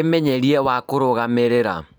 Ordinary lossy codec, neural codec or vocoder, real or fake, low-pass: none; vocoder, 44.1 kHz, 128 mel bands every 512 samples, BigVGAN v2; fake; none